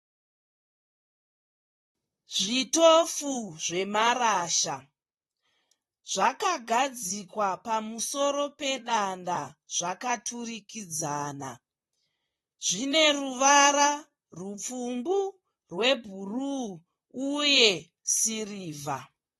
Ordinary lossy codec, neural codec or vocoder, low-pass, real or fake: AAC, 32 kbps; vocoder, 44.1 kHz, 128 mel bands, Pupu-Vocoder; 19.8 kHz; fake